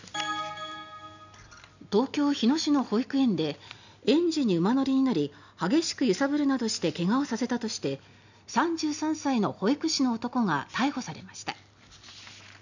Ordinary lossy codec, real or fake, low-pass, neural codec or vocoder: none; real; 7.2 kHz; none